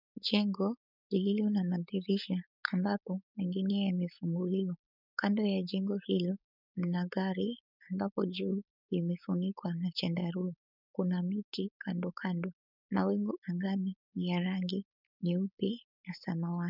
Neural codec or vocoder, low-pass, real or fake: codec, 16 kHz, 4.8 kbps, FACodec; 5.4 kHz; fake